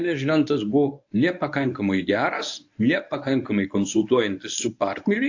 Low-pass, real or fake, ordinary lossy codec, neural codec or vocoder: 7.2 kHz; fake; AAC, 48 kbps; codec, 24 kHz, 0.9 kbps, WavTokenizer, medium speech release version 1